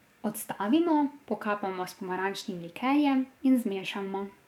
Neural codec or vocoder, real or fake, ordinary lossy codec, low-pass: codec, 44.1 kHz, 7.8 kbps, DAC; fake; none; 19.8 kHz